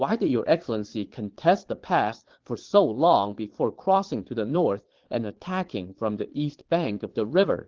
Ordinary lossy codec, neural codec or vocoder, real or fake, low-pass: Opus, 16 kbps; vocoder, 22.05 kHz, 80 mel bands, Vocos; fake; 7.2 kHz